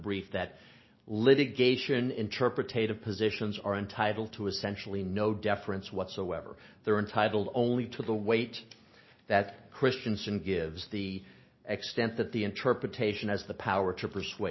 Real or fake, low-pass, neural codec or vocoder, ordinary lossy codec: real; 7.2 kHz; none; MP3, 24 kbps